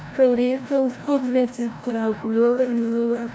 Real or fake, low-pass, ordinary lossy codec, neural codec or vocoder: fake; none; none; codec, 16 kHz, 0.5 kbps, FreqCodec, larger model